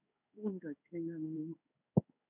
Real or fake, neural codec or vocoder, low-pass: fake; codec, 16 kHz in and 24 kHz out, 1 kbps, XY-Tokenizer; 3.6 kHz